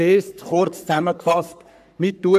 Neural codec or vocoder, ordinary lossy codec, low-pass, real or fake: codec, 44.1 kHz, 3.4 kbps, Pupu-Codec; none; 14.4 kHz; fake